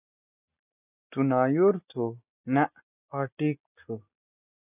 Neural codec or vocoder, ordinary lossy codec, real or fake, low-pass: none; AAC, 32 kbps; real; 3.6 kHz